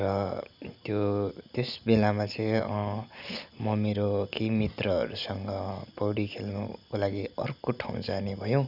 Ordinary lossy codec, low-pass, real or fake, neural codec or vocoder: none; 5.4 kHz; real; none